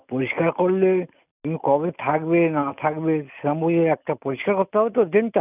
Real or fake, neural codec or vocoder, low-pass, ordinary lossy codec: real; none; 3.6 kHz; none